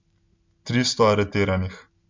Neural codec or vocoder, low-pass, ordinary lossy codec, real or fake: none; 7.2 kHz; MP3, 64 kbps; real